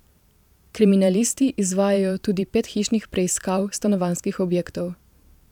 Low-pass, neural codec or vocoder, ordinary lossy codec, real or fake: 19.8 kHz; vocoder, 44.1 kHz, 128 mel bands every 512 samples, BigVGAN v2; none; fake